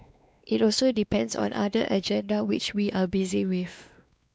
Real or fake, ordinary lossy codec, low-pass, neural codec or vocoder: fake; none; none; codec, 16 kHz, 2 kbps, X-Codec, WavLM features, trained on Multilingual LibriSpeech